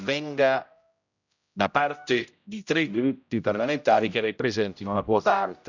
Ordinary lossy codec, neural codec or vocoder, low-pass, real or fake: none; codec, 16 kHz, 0.5 kbps, X-Codec, HuBERT features, trained on general audio; 7.2 kHz; fake